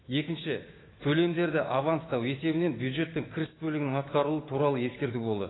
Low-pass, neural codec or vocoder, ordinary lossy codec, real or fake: 7.2 kHz; codec, 16 kHz, 6 kbps, DAC; AAC, 16 kbps; fake